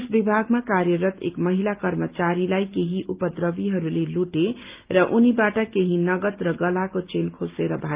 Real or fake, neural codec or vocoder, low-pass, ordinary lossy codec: real; none; 3.6 kHz; Opus, 32 kbps